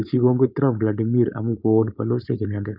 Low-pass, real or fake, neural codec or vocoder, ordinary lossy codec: 5.4 kHz; fake; codec, 16 kHz, 4.8 kbps, FACodec; none